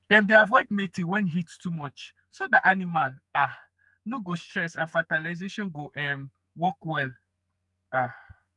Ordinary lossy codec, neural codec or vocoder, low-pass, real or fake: none; codec, 44.1 kHz, 2.6 kbps, SNAC; 10.8 kHz; fake